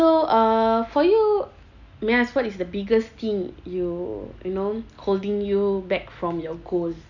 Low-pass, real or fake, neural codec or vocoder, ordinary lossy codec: 7.2 kHz; real; none; none